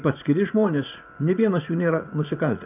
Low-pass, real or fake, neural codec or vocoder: 3.6 kHz; fake; vocoder, 44.1 kHz, 80 mel bands, Vocos